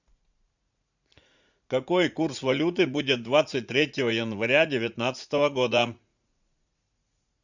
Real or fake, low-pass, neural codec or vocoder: fake; 7.2 kHz; vocoder, 44.1 kHz, 80 mel bands, Vocos